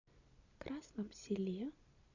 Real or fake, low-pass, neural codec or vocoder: real; 7.2 kHz; none